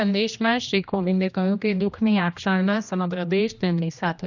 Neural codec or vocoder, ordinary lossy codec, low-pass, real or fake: codec, 16 kHz, 1 kbps, X-Codec, HuBERT features, trained on general audio; none; 7.2 kHz; fake